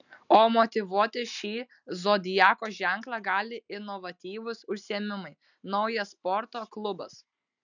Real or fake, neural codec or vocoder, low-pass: real; none; 7.2 kHz